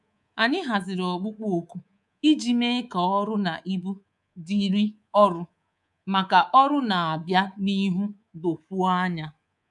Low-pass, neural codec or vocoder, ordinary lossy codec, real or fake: 10.8 kHz; codec, 24 kHz, 3.1 kbps, DualCodec; none; fake